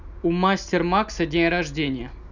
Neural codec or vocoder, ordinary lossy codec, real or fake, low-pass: none; none; real; 7.2 kHz